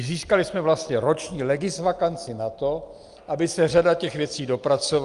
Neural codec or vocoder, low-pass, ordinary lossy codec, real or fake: none; 10.8 kHz; Opus, 32 kbps; real